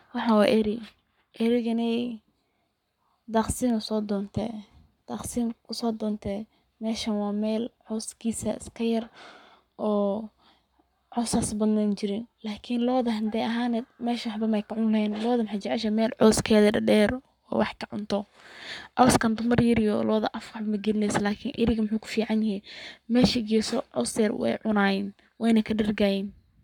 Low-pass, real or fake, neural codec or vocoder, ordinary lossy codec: 19.8 kHz; fake; codec, 44.1 kHz, 7.8 kbps, Pupu-Codec; none